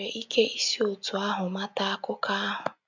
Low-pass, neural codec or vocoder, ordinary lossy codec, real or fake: 7.2 kHz; none; none; real